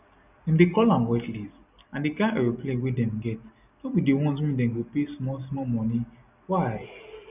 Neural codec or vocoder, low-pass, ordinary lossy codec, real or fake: none; 3.6 kHz; none; real